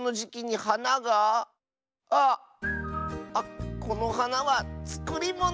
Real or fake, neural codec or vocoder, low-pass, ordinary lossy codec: real; none; none; none